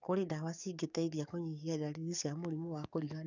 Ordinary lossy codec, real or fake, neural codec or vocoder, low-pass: none; fake; codec, 44.1 kHz, 7.8 kbps, DAC; 7.2 kHz